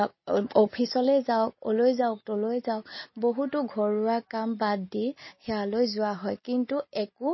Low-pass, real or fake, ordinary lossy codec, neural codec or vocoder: 7.2 kHz; real; MP3, 24 kbps; none